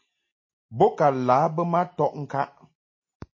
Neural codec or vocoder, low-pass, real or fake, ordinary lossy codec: none; 7.2 kHz; real; MP3, 32 kbps